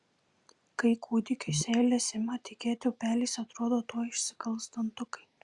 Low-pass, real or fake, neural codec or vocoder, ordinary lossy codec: 10.8 kHz; real; none; Opus, 64 kbps